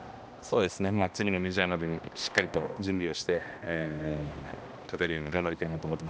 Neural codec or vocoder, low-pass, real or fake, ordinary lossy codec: codec, 16 kHz, 1 kbps, X-Codec, HuBERT features, trained on balanced general audio; none; fake; none